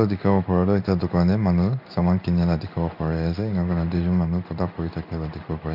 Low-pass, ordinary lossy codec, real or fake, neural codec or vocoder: 5.4 kHz; none; fake; codec, 16 kHz in and 24 kHz out, 1 kbps, XY-Tokenizer